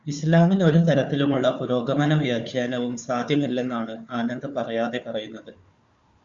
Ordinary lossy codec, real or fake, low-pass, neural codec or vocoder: Opus, 64 kbps; fake; 7.2 kHz; codec, 16 kHz, 4 kbps, FunCodec, trained on Chinese and English, 50 frames a second